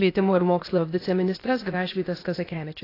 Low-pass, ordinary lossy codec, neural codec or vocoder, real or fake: 5.4 kHz; AAC, 24 kbps; codec, 16 kHz, 0.8 kbps, ZipCodec; fake